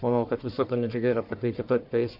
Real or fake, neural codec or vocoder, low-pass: fake; codec, 44.1 kHz, 1.7 kbps, Pupu-Codec; 5.4 kHz